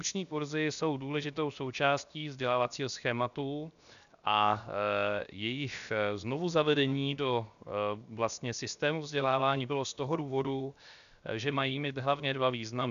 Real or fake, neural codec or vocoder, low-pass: fake; codec, 16 kHz, 0.7 kbps, FocalCodec; 7.2 kHz